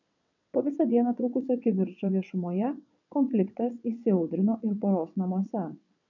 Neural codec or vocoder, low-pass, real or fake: vocoder, 22.05 kHz, 80 mel bands, WaveNeXt; 7.2 kHz; fake